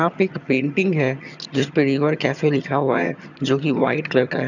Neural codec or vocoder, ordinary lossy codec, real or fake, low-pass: vocoder, 22.05 kHz, 80 mel bands, HiFi-GAN; none; fake; 7.2 kHz